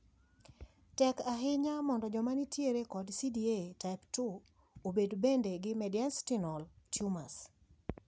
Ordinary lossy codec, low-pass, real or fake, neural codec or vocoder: none; none; real; none